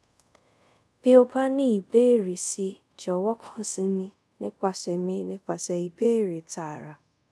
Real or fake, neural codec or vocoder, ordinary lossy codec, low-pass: fake; codec, 24 kHz, 0.5 kbps, DualCodec; none; none